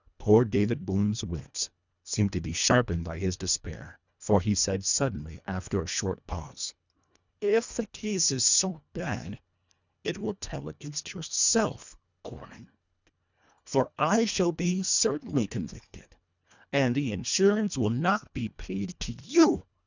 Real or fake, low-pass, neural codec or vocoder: fake; 7.2 kHz; codec, 24 kHz, 1.5 kbps, HILCodec